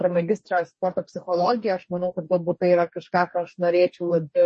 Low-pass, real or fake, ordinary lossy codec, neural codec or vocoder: 10.8 kHz; fake; MP3, 32 kbps; codec, 44.1 kHz, 2.6 kbps, DAC